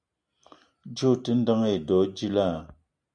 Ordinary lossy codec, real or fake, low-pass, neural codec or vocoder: MP3, 96 kbps; real; 9.9 kHz; none